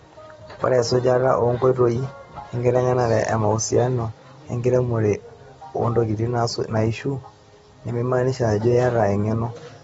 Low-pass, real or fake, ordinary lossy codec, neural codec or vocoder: 19.8 kHz; real; AAC, 24 kbps; none